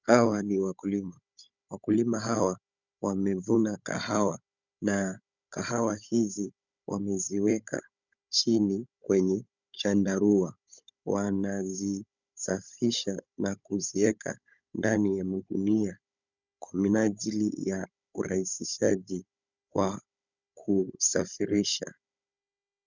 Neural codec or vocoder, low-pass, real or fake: codec, 16 kHz, 16 kbps, FunCodec, trained on Chinese and English, 50 frames a second; 7.2 kHz; fake